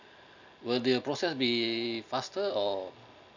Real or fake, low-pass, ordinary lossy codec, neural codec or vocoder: real; 7.2 kHz; none; none